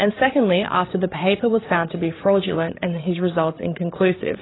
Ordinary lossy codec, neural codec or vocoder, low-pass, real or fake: AAC, 16 kbps; codec, 16 kHz, 8 kbps, FreqCodec, larger model; 7.2 kHz; fake